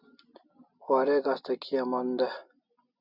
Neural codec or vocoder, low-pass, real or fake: none; 5.4 kHz; real